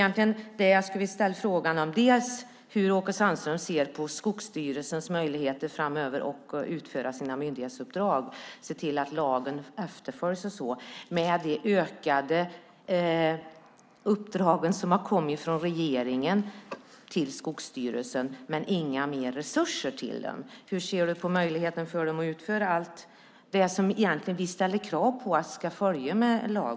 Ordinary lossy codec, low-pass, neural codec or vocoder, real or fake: none; none; none; real